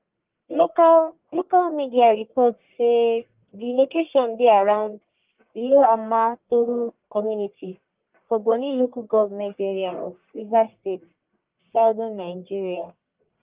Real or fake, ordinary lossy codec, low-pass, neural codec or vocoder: fake; Opus, 32 kbps; 3.6 kHz; codec, 44.1 kHz, 1.7 kbps, Pupu-Codec